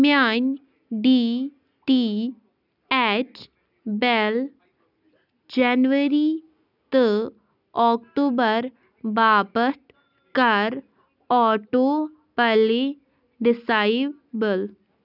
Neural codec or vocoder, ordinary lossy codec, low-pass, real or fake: none; none; 5.4 kHz; real